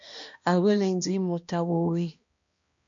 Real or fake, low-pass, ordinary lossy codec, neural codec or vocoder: fake; 7.2 kHz; MP3, 48 kbps; codec, 16 kHz, 1 kbps, X-Codec, HuBERT features, trained on balanced general audio